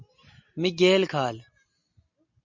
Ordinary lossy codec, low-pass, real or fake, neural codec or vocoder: MP3, 48 kbps; 7.2 kHz; real; none